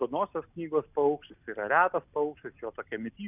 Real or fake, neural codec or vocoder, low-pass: real; none; 3.6 kHz